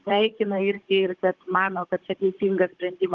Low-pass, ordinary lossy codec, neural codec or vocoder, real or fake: 7.2 kHz; Opus, 16 kbps; codec, 16 kHz, 16 kbps, FunCodec, trained on Chinese and English, 50 frames a second; fake